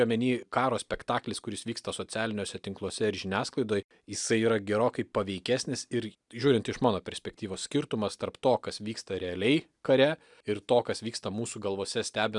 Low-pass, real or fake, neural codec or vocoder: 10.8 kHz; real; none